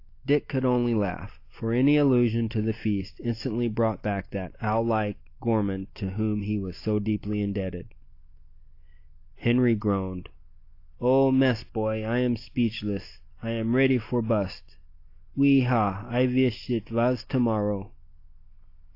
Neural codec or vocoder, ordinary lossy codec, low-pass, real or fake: none; AAC, 32 kbps; 5.4 kHz; real